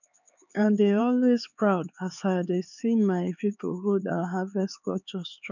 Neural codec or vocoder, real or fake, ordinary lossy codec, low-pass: codec, 16 kHz, 4 kbps, X-Codec, HuBERT features, trained on LibriSpeech; fake; none; 7.2 kHz